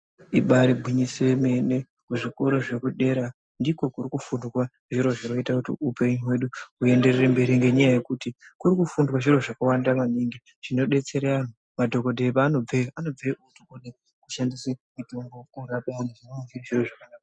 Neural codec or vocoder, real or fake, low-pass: none; real; 9.9 kHz